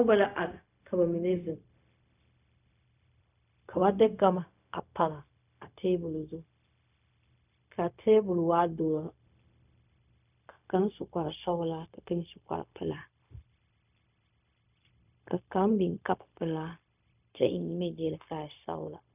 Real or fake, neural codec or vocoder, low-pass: fake; codec, 16 kHz, 0.4 kbps, LongCat-Audio-Codec; 3.6 kHz